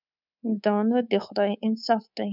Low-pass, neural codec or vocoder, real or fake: 5.4 kHz; codec, 24 kHz, 3.1 kbps, DualCodec; fake